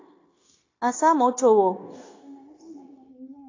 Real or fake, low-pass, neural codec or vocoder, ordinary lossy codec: fake; 7.2 kHz; codec, 16 kHz, 0.9 kbps, LongCat-Audio-Codec; AAC, 32 kbps